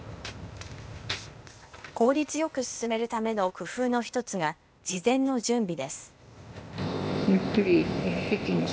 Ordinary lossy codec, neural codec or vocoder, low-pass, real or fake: none; codec, 16 kHz, 0.8 kbps, ZipCodec; none; fake